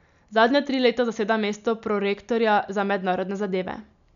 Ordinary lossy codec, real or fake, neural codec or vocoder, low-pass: none; real; none; 7.2 kHz